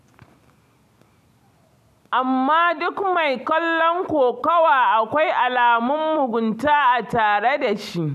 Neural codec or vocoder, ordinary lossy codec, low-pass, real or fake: none; none; 14.4 kHz; real